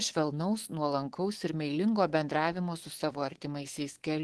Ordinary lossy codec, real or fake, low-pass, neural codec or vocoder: Opus, 16 kbps; fake; 10.8 kHz; codec, 24 kHz, 3.1 kbps, DualCodec